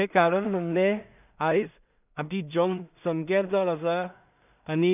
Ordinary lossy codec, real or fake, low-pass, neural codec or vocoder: none; fake; 3.6 kHz; codec, 16 kHz in and 24 kHz out, 0.4 kbps, LongCat-Audio-Codec, two codebook decoder